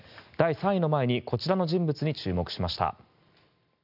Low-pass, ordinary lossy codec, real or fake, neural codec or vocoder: 5.4 kHz; none; real; none